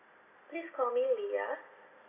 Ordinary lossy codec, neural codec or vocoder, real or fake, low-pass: MP3, 24 kbps; none; real; 3.6 kHz